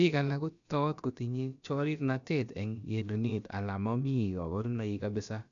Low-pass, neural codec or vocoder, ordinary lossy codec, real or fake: 7.2 kHz; codec, 16 kHz, about 1 kbps, DyCAST, with the encoder's durations; none; fake